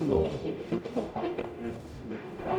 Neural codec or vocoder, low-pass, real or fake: codec, 44.1 kHz, 0.9 kbps, DAC; 19.8 kHz; fake